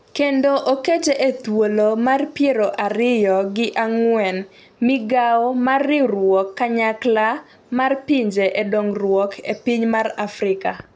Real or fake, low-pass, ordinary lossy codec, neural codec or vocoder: real; none; none; none